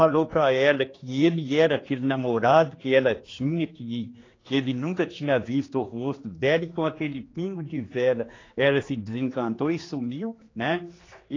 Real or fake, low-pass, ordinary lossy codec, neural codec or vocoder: fake; 7.2 kHz; AAC, 32 kbps; codec, 16 kHz, 2 kbps, X-Codec, HuBERT features, trained on general audio